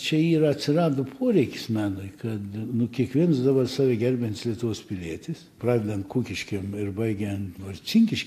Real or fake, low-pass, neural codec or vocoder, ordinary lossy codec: real; 14.4 kHz; none; AAC, 64 kbps